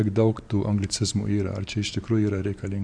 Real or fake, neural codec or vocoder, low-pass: fake; vocoder, 44.1 kHz, 128 mel bands every 512 samples, BigVGAN v2; 9.9 kHz